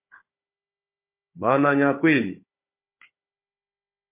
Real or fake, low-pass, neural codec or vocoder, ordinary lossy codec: fake; 3.6 kHz; codec, 16 kHz, 4 kbps, FunCodec, trained on Chinese and English, 50 frames a second; MP3, 24 kbps